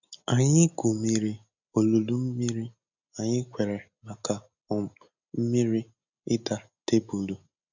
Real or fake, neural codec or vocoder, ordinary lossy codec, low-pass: real; none; none; 7.2 kHz